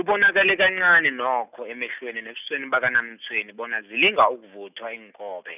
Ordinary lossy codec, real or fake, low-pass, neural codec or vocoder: none; real; 3.6 kHz; none